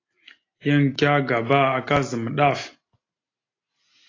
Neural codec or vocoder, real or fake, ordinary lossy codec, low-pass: none; real; AAC, 32 kbps; 7.2 kHz